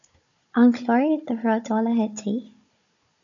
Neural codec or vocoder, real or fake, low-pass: codec, 16 kHz, 16 kbps, FunCodec, trained on Chinese and English, 50 frames a second; fake; 7.2 kHz